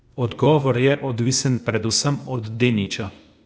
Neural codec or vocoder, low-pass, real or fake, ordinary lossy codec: codec, 16 kHz, 0.8 kbps, ZipCodec; none; fake; none